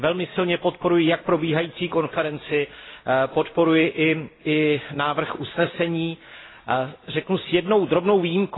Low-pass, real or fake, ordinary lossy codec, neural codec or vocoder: 7.2 kHz; real; AAC, 16 kbps; none